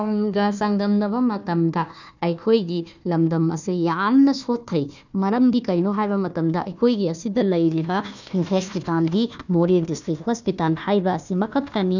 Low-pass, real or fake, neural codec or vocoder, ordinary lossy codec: 7.2 kHz; fake; codec, 16 kHz, 1 kbps, FunCodec, trained on Chinese and English, 50 frames a second; none